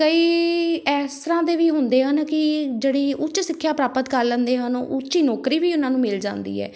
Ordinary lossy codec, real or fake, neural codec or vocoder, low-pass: none; real; none; none